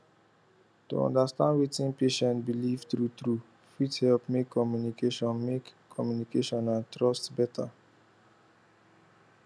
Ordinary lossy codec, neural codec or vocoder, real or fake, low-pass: none; none; real; none